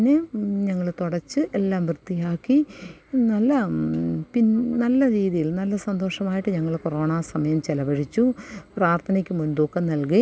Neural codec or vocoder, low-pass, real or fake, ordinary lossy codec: none; none; real; none